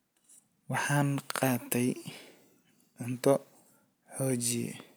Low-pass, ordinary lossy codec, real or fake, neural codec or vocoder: none; none; real; none